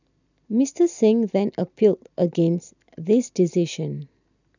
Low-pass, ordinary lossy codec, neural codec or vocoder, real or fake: 7.2 kHz; none; none; real